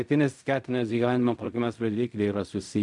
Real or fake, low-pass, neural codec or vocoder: fake; 10.8 kHz; codec, 16 kHz in and 24 kHz out, 0.4 kbps, LongCat-Audio-Codec, fine tuned four codebook decoder